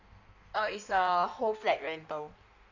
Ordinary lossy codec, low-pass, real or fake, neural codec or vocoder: none; 7.2 kHz; fake; codec, 16 kHz in and 24 kHz out, 1.1 kbps, FireRedTTS-2 codec